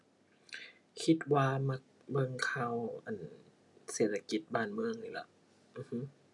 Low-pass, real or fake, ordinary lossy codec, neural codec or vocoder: 10.8 kHz; real; none; none